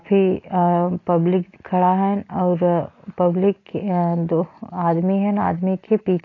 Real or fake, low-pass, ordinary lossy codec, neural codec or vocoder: real; 7.2 kHz; AAC, 32 kbps; none